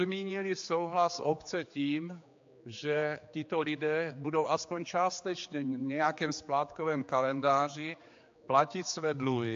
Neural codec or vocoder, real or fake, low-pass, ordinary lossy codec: codec, 16 kHz, 4 kbps, X-Codec, HuBERT features, trained on general audio; fake; 7.2 kHz; AAC, 48 kbps